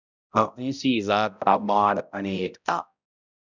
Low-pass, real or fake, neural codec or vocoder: 7.2 kHz; fake; codec, 16 kHz, 0.5 kbps, X-Codec, HuBERT features, trained on balanced general audio